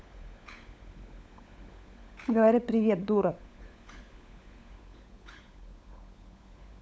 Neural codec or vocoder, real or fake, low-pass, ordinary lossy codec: codec, 16 kHz, 16 kbps, FunCodec, trained on LibriTTS, 50 frames a second; fake; none; none